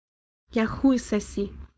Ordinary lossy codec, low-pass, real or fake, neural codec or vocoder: none; none; fake; codec, 16 kHz, 4.8 kbps, FACodec